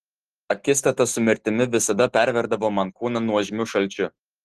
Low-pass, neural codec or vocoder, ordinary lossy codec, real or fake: 14.4 kHz; none; Opus, 16 kbps; real